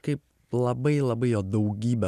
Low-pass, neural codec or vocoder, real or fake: 14.4 kHz; none; real